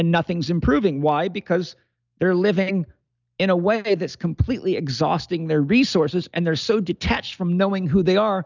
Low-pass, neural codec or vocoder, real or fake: 7.2 kHz; none; real